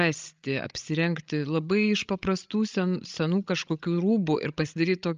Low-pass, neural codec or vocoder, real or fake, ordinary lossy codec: 7.2 kHz; codec, 16 kHz, 16 kbps, FreqCodec, larger model; fake; Opus, 24 kbps